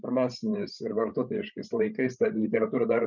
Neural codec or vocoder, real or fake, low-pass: codec, 16 kHz, 16 kbps, FreqCodec, larger model; fake; 7.2 kHz